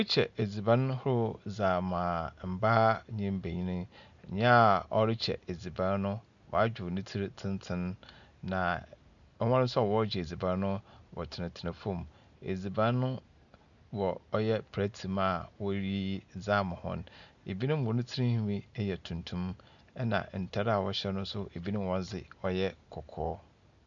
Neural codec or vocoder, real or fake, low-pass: none; real; 7.2 kHz